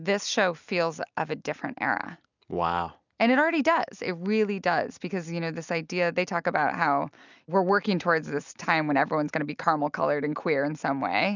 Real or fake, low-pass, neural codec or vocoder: real; 7.2 kHz; none